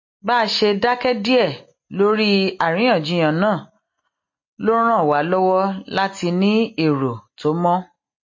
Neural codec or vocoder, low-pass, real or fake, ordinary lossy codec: none; 7.2 kHz; real; MP3, 32 kbps